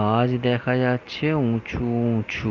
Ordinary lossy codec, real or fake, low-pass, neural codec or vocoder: Opus, 32 kbps; real; 7.2 kHz; none